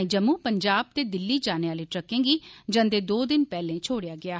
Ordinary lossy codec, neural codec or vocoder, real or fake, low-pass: none; none; real; none